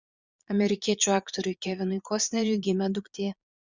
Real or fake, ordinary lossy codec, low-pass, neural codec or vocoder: fake; Opus, 64 kbps; 7.2 kHz; vocoder, 22.05 kHz, 80 mel bands, Vocos